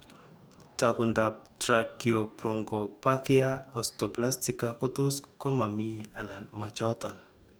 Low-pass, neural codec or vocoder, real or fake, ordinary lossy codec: none; codec, 44.1 kHz, 2.6 kbps, DAC; fake; none